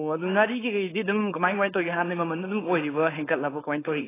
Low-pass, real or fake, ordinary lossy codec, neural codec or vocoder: 3.6 kHz; fake; AAC, 16 kbps; codec, 16 kHz, 4.8 kbps, FACodec